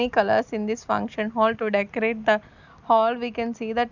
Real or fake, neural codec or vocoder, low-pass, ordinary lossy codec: real; none; 7.2 kHz; none